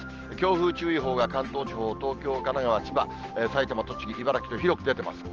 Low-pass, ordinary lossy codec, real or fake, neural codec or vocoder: 7.2 kHz; Opus, 16 kbps; real; none